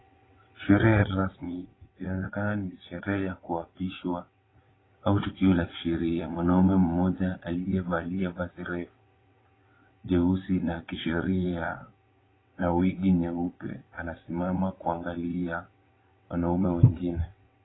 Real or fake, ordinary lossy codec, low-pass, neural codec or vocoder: fake; AAC, 16 kbps; 7.2 kHz; vocoder, 22.05 kHz, 80 mel bands, WaveNeXt